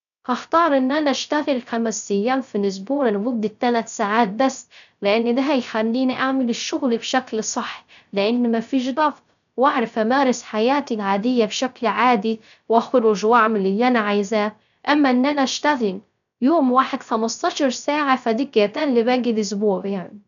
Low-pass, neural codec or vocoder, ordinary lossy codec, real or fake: 7.2 kHz; codec, 16 kHz, 0.3 kbps, FocalCodec; none; fake